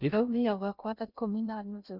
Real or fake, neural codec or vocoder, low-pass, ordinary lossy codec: fake; codec, 16 kHz in and 24 kHz out, 0.6 kbps, FocalCodec, streaming, 2048 codes; 5.4 kHz; none